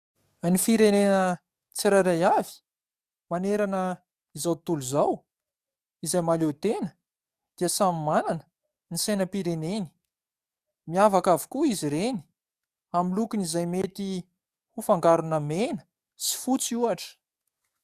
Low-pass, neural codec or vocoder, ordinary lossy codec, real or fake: 14.4 kHz; codec, 44.1 kHz, 7.8 kbps, DAC; Opus, 64 kbps; fake